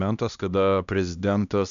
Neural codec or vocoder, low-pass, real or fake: codec, 16 kHz, 1 kbps, X-Codec, HuBERT features, trained on LibriSpeech; 7.2 kHz; fake